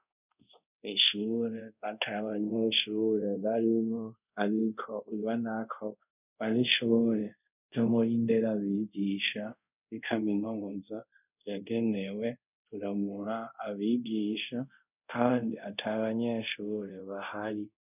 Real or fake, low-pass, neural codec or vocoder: fake; 3.6 kHz; codec, 24 kHz, 0.5 kbps, DualCodec